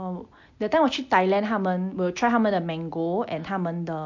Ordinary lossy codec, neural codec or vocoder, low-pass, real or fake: MP3, 48 kbps; none; 7.2 kHz; real